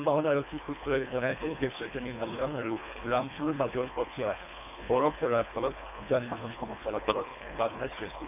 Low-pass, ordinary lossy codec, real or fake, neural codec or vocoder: 3.6 kHz; none; fake; codec, 24 kHz, 1.5 kbps, HILCodec